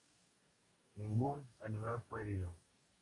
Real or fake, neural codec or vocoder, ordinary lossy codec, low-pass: fake; codec, 44.1 kHz, 2.6 kbps, DAC; AAC, 32 kbps; 10.8 kHz